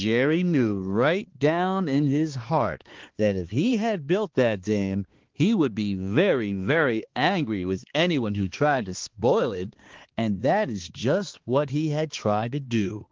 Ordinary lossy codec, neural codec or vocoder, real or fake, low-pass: Opus, 16 kbps; codec, 16 kHz, 2 kbps, X-Codec, HuBERT features, trained on balanced general audio; fake; 7.2 kHz